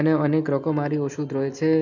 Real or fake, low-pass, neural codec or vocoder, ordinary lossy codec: real; 7.2 kHz; none; none